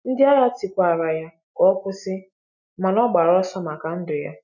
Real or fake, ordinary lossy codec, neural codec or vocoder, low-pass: real; none; none; 7.2 kHz